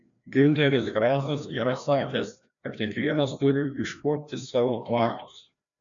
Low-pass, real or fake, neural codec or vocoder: 7.2 kHz; fake; codec, 16 kHz, 1 kbps, FreqCodec, larger model